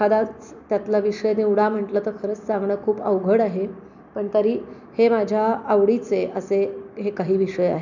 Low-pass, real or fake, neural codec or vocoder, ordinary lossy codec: 7.2 kHz; real; none; none